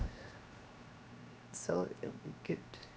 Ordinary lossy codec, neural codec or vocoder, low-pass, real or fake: none; codec, 16 kHz, 0.7 kbps, FocalCodec; none; fake